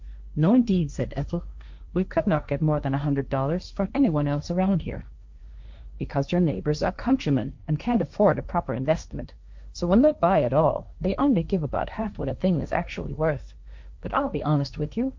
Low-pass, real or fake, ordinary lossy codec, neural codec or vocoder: 7.2 kHz; fake; MP3, 64 kbps; codec, 16 kHz, 1.1 kbps, Voila-Tokenizer